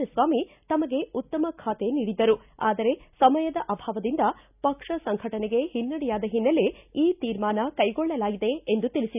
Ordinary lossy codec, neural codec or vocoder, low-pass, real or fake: none; none; 3.6 kHz; real